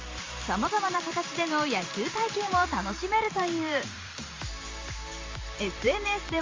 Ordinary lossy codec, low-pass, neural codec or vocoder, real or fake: Opus, 32 kbps; 7.2 kHz; vocoder, 44.1 kHz, 128 mel bands every 512 samples, BigVGAN v2; fake